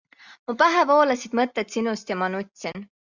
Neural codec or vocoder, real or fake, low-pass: none; real; 7.2 kHz